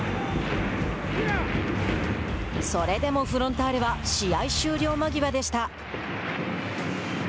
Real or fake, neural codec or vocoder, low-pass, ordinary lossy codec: real; none; none; none